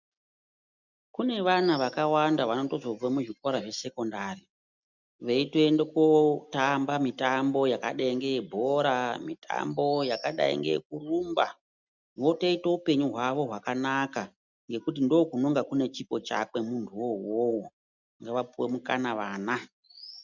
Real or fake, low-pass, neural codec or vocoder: real; 7.2 kHz; none